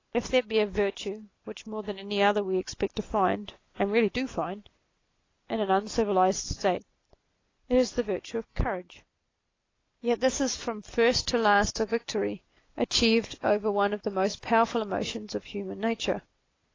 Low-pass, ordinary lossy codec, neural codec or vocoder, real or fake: 7.2 kHz; AAC, 32 kbps; none; real